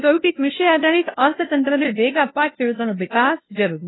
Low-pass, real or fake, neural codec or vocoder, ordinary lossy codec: 7.2 kHz; fake; codec, 16 kHz, 0.5 kbps, FunCodec, trained on LibriTTS, 25 frames a second; AAC, 16 kbps